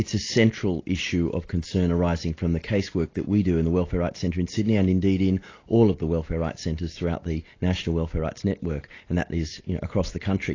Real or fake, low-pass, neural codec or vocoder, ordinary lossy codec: real; 7.2 kHz; none; AAC, 32 kbps